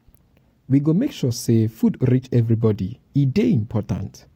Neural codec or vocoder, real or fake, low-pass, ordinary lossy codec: none; real; 19.8 kHz; AAC, 48 kbps